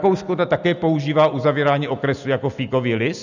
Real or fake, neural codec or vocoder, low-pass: real; none; 7.2 kHz